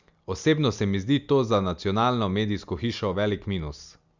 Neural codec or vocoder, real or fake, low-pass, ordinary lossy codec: none; real; 7.2 kHz; Opus, 64 kbps